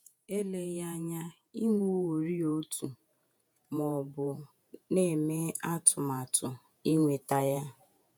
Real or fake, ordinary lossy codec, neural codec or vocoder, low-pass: fake; none; vocoder, 48 kHz, 128 mel bands, Vocos; none